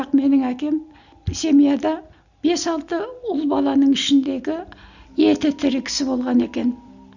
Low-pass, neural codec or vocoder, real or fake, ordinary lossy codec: 7.2 kHz; none; real; none